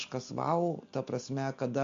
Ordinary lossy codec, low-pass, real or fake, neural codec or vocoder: MP3, 48 kbps; 7.2 kHz; real; none